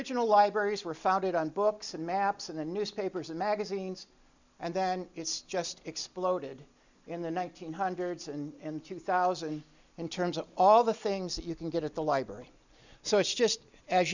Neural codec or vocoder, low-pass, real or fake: none; 7.2 kHz; real